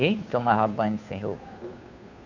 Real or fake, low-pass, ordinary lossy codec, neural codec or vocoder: fake; 7.2 kHz; none; codec, 16 kHz, 2 kbps, FunCodec, trained on Chinese and English, 25 frames a second